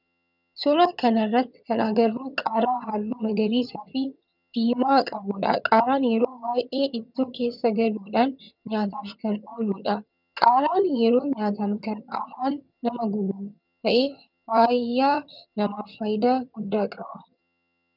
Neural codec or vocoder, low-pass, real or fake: vocoder, 22.05 kHz, 80 mel bands, HiFi-GAN; 5.4 kHz; fake